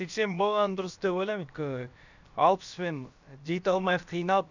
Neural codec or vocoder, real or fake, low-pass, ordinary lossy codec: codec, 16 kHz, about 1 kbps, DyCAST, with the encoder's durations; fake; 7.2 kHz; none